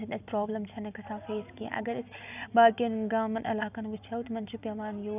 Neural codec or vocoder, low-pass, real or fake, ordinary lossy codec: none; 3.6 kHz; real; none